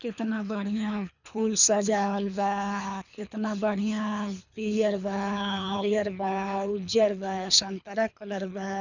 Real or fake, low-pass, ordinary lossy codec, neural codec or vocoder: fake; 7.2 kHz; none; codec, 24 kHz, 3 kbps, HILCodec